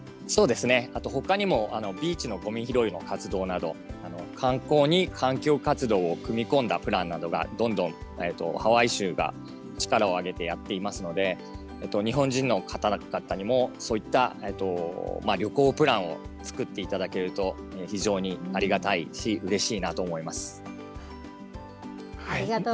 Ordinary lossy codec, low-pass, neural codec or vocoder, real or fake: none; none; none; real